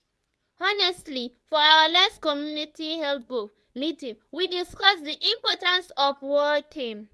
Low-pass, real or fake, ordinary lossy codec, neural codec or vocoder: none; fake; none; codec, 24 kHz, 0.9 kbps, WavTokenizer, medium speech release version 2